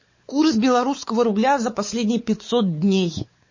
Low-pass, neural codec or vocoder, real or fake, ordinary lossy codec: 7.2 kHz; codec, 16 kHz, 4 kbps, X-Codec, WavLM features, trained on Multilingual LibriSpeech; fake; MP3, 32 kbps